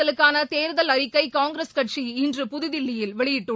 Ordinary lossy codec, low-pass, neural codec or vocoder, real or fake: none; none; none; real